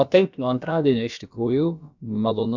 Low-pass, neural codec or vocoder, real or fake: 7.2 kHz; codec, 16 kHz, about 1 kbps, DyCAST, with the encoder's durations; fake